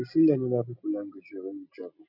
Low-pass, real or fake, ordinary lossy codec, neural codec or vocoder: 5.4 kHz; real; none; none